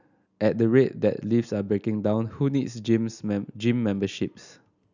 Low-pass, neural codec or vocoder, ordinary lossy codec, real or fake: 7.2 kHz; none; none; real